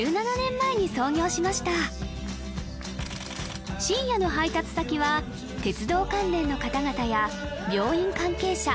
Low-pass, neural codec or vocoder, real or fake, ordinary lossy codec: none; none; real; none